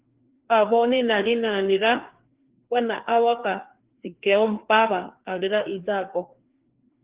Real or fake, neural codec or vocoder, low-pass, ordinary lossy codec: fake; codec, 16 kHz, 2 kbps, FreqCodec, larger model; 3.6 kHz; Opus, 16 kbps